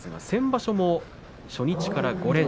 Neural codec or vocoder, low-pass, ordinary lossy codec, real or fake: none; none; none; real